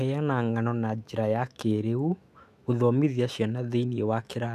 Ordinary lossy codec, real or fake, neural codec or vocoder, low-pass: none; fake; autoencoder, 48 kHz, 128 numbers a frame, DAC-VAE, trained on Japanese speech; 14.4 kHz